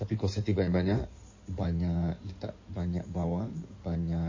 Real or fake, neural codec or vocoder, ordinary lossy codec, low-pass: fake; codec, 16 kHz in and 24 kHz out, 2.2 kbps, FireRedTTS-2 codec; MP3, 32 kbps; 7.2 kHz